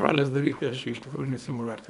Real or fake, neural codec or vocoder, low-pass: fake; codec, 24 kHz, 0.9 kbps, WavTokenizer, small release; 10.8 kHz